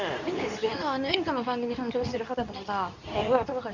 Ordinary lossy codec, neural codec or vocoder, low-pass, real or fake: none; codec, 24 kHz, 0.9 kbps, WavTokenizer, medium speech release version 2; 7.2 kHz; fake